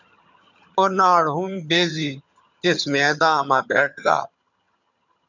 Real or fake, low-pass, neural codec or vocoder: fake; 7.2 kHz; vocoder, 22.05 kHz, 80 mel bands, HiFi-GAN